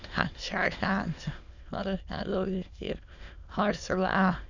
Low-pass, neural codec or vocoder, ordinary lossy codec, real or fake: 7.2 kHz; autoencoder, 22.05 kHz, a latent of 192 numbers a frame, VITS, trained on many speakers; none; fake